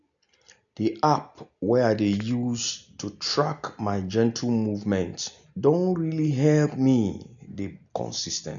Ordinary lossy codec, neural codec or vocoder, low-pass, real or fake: none; none; 7.2 kHz; real